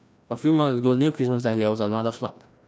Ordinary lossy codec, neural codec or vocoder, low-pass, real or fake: none; codec, 16 kHz, 1 kbps, FreqCodec, larger model; none; fake